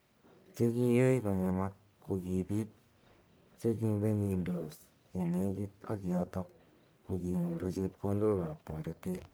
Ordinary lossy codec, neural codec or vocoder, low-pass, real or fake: none; codec, 44.1 kHz, 1.7 kbps, Pupu-Codec; none; fake